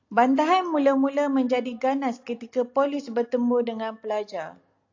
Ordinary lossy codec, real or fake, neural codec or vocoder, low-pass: AAC, 48 kbps; real; none; 7.2 kHz